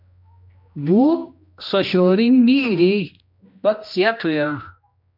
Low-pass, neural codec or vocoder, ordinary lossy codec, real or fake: 5.4 kHz; codec, 16 kHz, 1 kbps, X-Codec, HuBERT features, trained on general audio; MP3, 48 kbps; fake